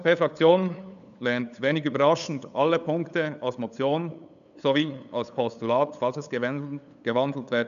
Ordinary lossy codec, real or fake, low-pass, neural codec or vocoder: none; fake; 7.2 kHz; codec, 16 kHz, 8 kbps, FunCodec, trained on LibriTTS, 25 frames a second